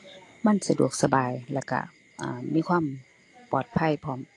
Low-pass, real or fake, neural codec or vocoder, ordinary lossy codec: 10.8 kHz; real; none; AAC, 48 kbps